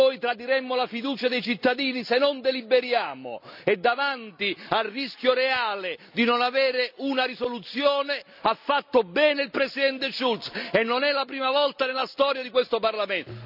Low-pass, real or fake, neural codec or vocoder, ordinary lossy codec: 5.4 kHz; real; none; none